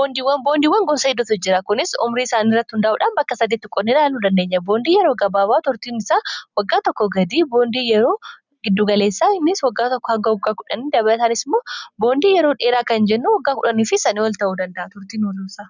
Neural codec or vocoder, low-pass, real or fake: none; 7.2 kHz; real